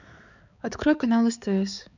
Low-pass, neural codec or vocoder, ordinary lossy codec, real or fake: 7.2 kHz; codec, 16 kHz, 4 kbps, X-Codec, HuBERT features, trained on balanced general audio; none; fake